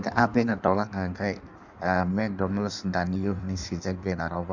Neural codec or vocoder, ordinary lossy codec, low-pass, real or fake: codec, 16 kHz in and 24 kHz out, 1.1 kbps, FireRedTTS-2 codec; none; 7.2 kHz; fake